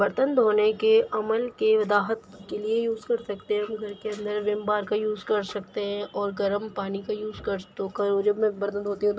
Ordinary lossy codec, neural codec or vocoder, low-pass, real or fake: none; none; none; real